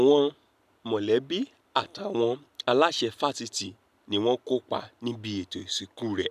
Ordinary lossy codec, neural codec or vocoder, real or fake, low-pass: none; none; real; 14.4 kHz